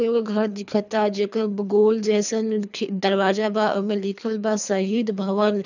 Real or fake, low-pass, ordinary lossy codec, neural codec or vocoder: fake; 7.2 kHz; none; codec, 24 kHz, 3 kbps, HILCodec